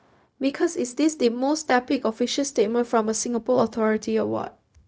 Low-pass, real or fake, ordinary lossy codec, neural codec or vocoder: none; fake; none; codec, 16 kHz, 0.4 kbps, LongCat-Audio-Codec